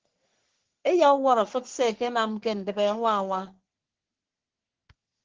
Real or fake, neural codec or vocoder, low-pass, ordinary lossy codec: fake; codec, 44.1 kHz, 3.4 kbps, Pupu-Codec; 7.2 kHz; Opus, 16 kbps